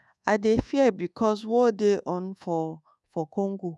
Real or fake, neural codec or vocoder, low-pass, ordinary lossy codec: fake; codec, 24 kHz, 1.2 kbps, DualCodec; none; none